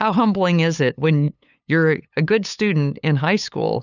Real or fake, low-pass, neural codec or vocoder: fake; 7.2 kHz; codec, 16 kHz, 8 kbps, FunCodec, trained on LibriTTS, 25 frames a second